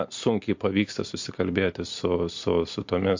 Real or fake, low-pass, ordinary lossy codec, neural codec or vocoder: real; 7.2 kHz; MP3, 48 kbps; none